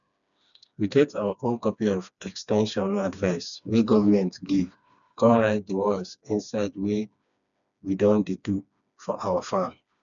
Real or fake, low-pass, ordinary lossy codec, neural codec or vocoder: fake; 7.2 kHz; none; codec, 16 kHz, 2 kbps, FreqCodec, smaller model